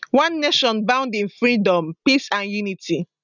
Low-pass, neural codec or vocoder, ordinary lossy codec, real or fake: 7.2 kHz; none; none; real